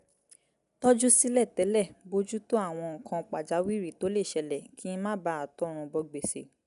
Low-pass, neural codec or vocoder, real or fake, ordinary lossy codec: 10.8 kHz; none; real; none